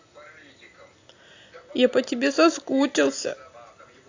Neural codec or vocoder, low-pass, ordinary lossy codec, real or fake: none; 7.2 kHz; AAC, 48 kbps; real